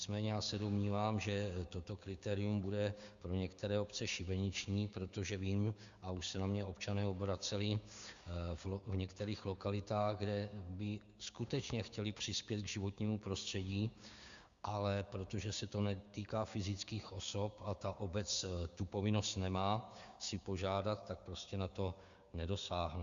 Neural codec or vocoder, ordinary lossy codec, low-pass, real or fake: codec, 16 kHz, 6 kbps, DAC; Opus, 64 kbps; 7.2 kHz; fake